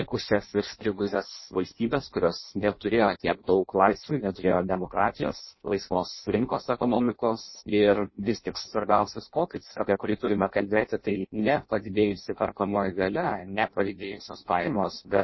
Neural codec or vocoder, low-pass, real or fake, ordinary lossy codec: codec, 16 kHz in and 24 kHz out, 0.6 kbps, FireRedTTS-2 codec; 7.2 kHz; fake; MP3, 24 kbps